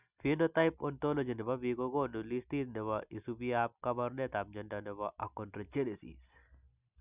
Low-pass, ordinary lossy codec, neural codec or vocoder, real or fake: 3.6 kHz; none; none; real